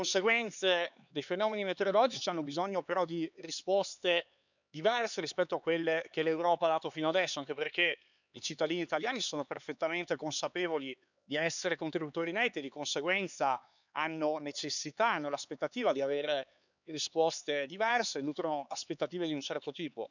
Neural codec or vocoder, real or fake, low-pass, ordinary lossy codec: codec, 16 kHz, 4 kbps, X-Codec, HuBERT features, trained on LibriSpeech; fake; 7.2 kHz; none